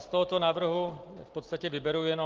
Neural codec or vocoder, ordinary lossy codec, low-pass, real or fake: none; Opus, 16 kbps; 7.2 kHz; real